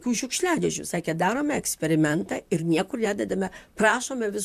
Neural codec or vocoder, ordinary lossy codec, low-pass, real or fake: vocoder, 44.1 kHz, 128 mel bands, Pupu-Vocoder; MP3, 96 kbps; 14.4 kHz; fake